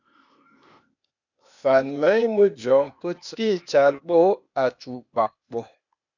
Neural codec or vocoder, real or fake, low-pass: codec, 16 kHz, 0.8 kbps, ZipCodec; fake; 7.2 kHz